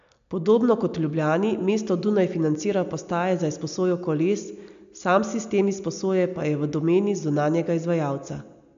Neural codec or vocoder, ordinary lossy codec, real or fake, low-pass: none; MP3, 64 kbps; real; 7.2 kHz